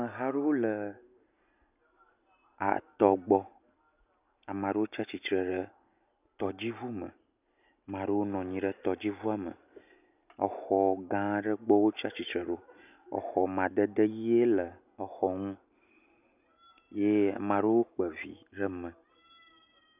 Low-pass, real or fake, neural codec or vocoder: 3.6 kHz; real; none